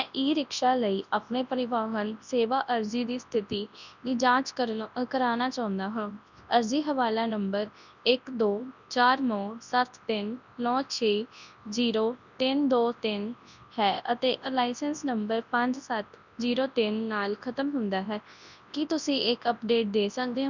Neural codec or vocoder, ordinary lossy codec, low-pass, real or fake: codec, 24 kHz, 0.9 kbps, WavTokenizer, large speech release; none; 7.2 kHz; fake